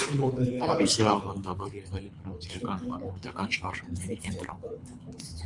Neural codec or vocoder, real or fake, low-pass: codec, 24 kHz, 3 kbps, HILCodec; fake; 10.8 kHz